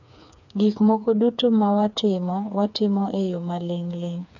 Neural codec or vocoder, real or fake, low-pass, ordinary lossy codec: codec, 16 kHz, 4 kbps, FreqCodec, smaller model; fake; 7.2 kHz; none